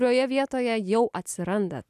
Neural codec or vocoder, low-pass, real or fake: none; 14.4 kHz; real